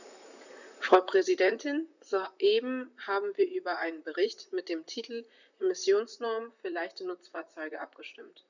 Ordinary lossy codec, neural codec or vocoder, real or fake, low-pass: none; vocoder, 44.1 kHz, 128 mel bands, Pupu-Vocoder; fake; 7.2 kHz